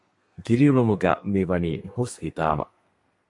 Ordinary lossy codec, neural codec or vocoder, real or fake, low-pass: MP3, 48 kbps; codec, 32 kHz, 1.9 kbps, SNAC; fake; 10.8 kHz